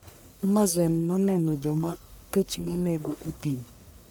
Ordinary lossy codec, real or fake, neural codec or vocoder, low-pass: none; fake; codec, 44.1 kHz, 1.7 kbps, Pupu-Codec; none